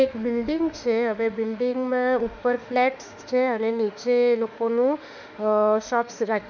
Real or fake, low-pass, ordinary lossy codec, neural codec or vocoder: fake; 7.2 kHz; none; autoencoder, 48 kHz, 32 numbers a frame, DAC-VAE, trained on Japanese speech